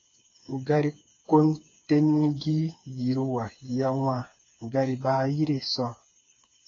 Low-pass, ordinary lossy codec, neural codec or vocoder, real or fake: 7.2 kHz; AAC, 32 kbps; codec, 16 kHz, 4 kbps, FreqCodec, smaller model; fake